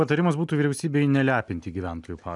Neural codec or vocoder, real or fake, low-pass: none; real; 10.8 kHz